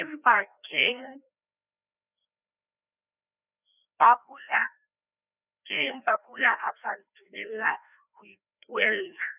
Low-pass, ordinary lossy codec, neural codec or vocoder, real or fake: 3.6 kHz; none; codec, 16 kHz, 1 kbps, FreqCodec, larger model; fake